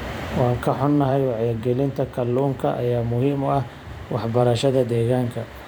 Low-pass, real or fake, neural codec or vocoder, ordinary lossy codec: none; fake; vocoder, 44.1 kHz, 128 mel bands every 512 samples, BigVGAN v2; none